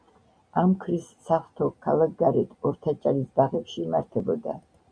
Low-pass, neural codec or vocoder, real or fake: 9.9 kHz; none; real